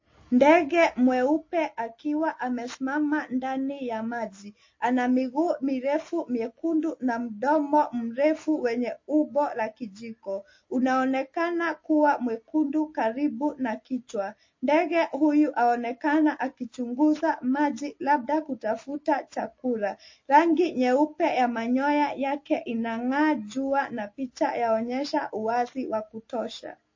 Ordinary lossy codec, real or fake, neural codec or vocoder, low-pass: MP3, 32 kbps; real; none; 7.2 kHz